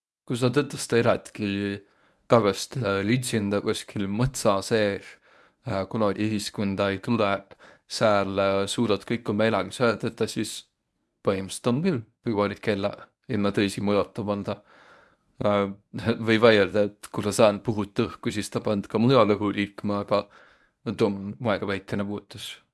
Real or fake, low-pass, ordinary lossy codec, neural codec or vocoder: fake; none; none; codec, 24 kHz, 0.9 kbps, WavTokenizer, medium speech release version 1